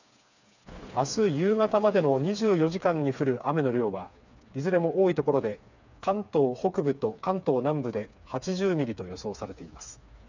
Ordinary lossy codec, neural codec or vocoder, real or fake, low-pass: none; codec, 16 kHz, 4 kbps, FreqCodec, smaller model; fake; 7.2 kHz